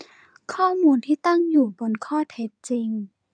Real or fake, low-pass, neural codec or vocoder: fake; 9.9 kHz; codec, 16 kHz in and 24 kHz out, 2.2 kbps, FireRedTTS-2 codec